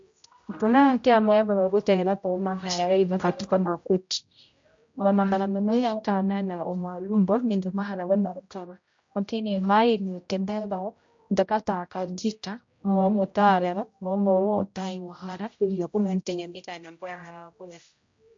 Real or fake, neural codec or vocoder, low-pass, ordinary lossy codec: fake; codec, 16 kHz, 0.5 kbps, X-Codec, HuBERT features, trained on general audio; 7.2 kHz; MP3, 48 kbps